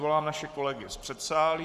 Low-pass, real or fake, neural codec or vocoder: 14.4 kHz; fake; codec, 44.1 kHz, 7.8 kbps, Pupu-Codec